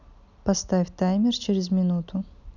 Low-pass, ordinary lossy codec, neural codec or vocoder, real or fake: 7.2 kHz; none; none; real